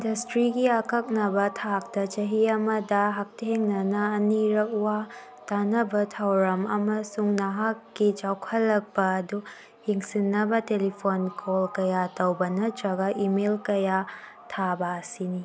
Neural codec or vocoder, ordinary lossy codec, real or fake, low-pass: none; none; real; none